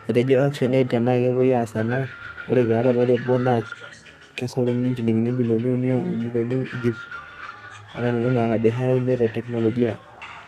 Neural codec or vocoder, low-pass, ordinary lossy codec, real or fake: codec, 32 kHz, 1.9 kbps, SNAC; 14.4 kHz; none; fake